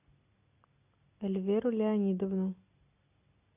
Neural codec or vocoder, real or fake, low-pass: none; real; 3.6 kHz